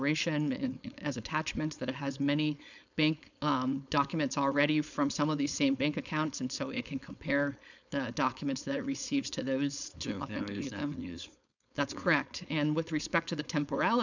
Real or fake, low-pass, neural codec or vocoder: fake; 7.2 kHz; codec, 16 kHz, 4.8 kbps, FACodec